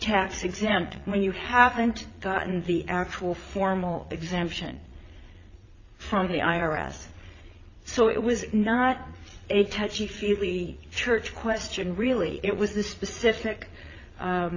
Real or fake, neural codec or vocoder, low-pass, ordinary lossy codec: fake; vocoder, 22.05 kHz, 80 mel bands, Vocos; 7.2 kHz; AAC, 32 kbps